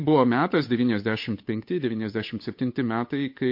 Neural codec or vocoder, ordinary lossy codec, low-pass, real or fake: codec, 16 kHz, 8 kbps, FunCodec, trained on Chinese and English, 25 frames a second; MP3, 32 kbps; 5.4 kHz; fake